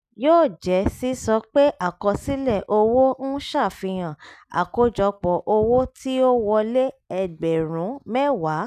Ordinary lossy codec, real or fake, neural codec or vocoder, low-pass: none; real; none; 14.4 kHz